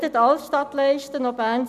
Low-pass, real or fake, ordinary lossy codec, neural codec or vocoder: 14.4 kHz; real; none; none